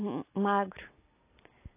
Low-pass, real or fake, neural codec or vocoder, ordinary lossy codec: 3.6 kHz; real; none; AAC, 24 kbps